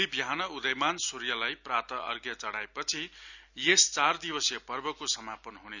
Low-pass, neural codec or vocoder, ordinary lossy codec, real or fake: 7.2 kHz; none; none; real